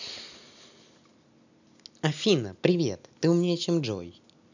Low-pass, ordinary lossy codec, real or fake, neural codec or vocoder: 7.2 kHz; none; real; none